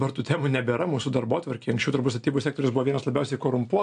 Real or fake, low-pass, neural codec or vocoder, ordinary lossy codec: real; 9.9 kHz; none; AAC, 64 kbps